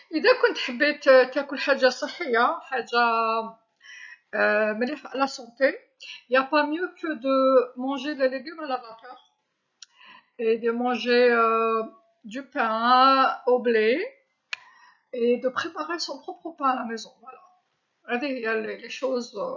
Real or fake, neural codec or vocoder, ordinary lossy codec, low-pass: real; none; none; 7.2 kHz